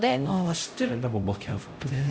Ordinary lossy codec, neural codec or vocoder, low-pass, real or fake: none; codec, 16 kHz, 0.5 kbps, X-Codec, HuBERT features, trained on LibriSpeech; none; fake